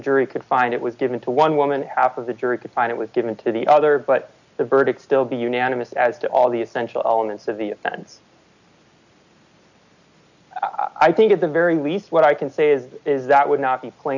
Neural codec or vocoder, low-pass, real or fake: none; 7.2 kHz; real